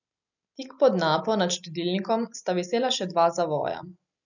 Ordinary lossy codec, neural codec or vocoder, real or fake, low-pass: none; none; real; 7.2 kHz